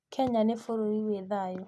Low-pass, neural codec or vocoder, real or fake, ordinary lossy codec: none; none; real; none